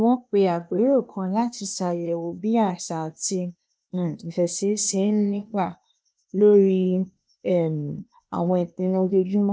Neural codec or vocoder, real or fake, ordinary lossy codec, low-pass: codec, 16 kHz, 0.8 kbps, ZipCodec; fake; none; none